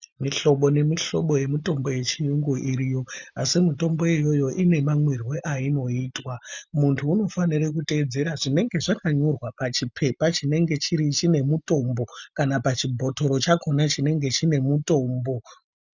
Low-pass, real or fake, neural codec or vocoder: 7.2 kHz; real; none